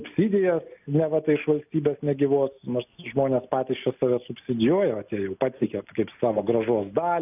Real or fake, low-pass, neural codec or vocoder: real; 3.6 kHz; none